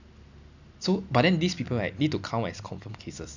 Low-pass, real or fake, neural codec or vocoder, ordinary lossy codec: 7.2 kHz; real; none; none